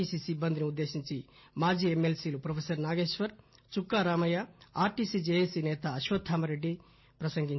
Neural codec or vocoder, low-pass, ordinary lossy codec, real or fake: none; 7.2 kHz; MP3, 24 kbps; real